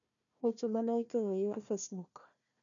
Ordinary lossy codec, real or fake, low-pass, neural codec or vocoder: none; fake; 7.2 kHz; codec, 16 kHz, 1 kbps, FunCodec, trained on Chinese and English, 50 frames a second